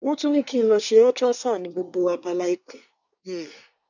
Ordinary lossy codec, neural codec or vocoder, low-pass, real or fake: none; codec, 24 kHz, 1 kbps, SNAC; 7.2 kHz; fake